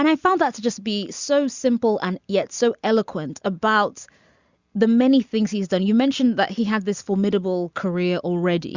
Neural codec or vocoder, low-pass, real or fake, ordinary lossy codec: none; 7.2 kHz; real; Opus, 64 kbps